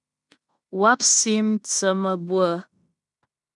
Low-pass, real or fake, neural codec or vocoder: 10.8 kHz; fake; codec, 16 kHz in and 24 kHz out, 0.9 kbps, LongCat-Audio-Codec, fine tuned four codebook decoder